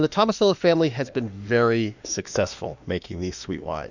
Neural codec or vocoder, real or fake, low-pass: autoencoder, 48 kHz, 32 numbers a frame, DAC-VAE, trained on Japanese speech; fake; 7.2 kHz